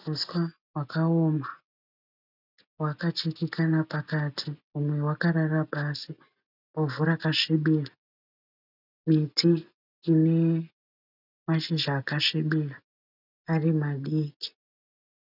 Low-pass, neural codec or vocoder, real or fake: 5.4 kHz; none; real